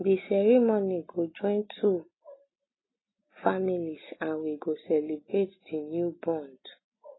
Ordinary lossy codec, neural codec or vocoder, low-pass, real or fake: AAC, 16 kbps; none; 7.2 kHz; real